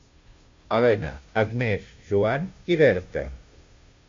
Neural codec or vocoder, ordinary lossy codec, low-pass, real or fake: codec, 16 kHz, 0.5 kbps, FunCodec, trained on Chinese and English, 25 frames a second; MP3, 48 kbps; 7.2 kHz; fake